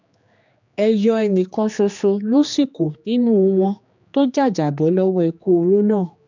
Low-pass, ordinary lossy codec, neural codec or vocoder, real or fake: 7.2 kHz; none; codec, 16 kHz, 2 kbps, X-Codec, HuBERT features, trained on general audio; fake